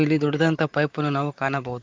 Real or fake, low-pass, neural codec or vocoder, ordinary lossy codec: fake; 7.2 kHz; vocoder, 44.1 kHz, 128 mel bands, Pupu-Vocoder; Opus, 24 kbps